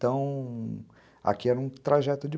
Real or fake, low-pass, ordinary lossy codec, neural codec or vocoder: real; none; none; none